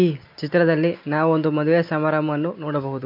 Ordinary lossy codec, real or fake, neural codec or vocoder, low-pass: MP3, 48 kbps; real; none; 5.4 kHz